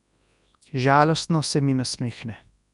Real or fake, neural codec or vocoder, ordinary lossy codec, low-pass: fake; codec, 24 kHz, 0.9 kbps, WavTokenizer, large speech release; none; 10.8 kHz